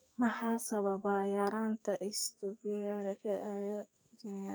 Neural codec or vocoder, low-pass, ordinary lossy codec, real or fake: codec, 44.1 kHz, 2.6 kbps, SNAC; none; none; fake